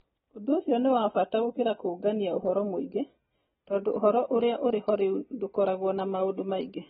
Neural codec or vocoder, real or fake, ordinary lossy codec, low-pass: none; real; AAC, 16 kbps; 19.8 kHz